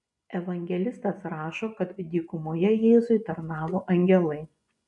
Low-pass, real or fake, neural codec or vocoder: 10.8 kHz; fake; vocoder, 24 kHz, 100 mel bands, Vocos